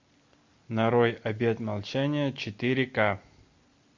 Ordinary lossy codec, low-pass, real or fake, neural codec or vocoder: MP3, 48 kbps; 7.2 kHz; real; none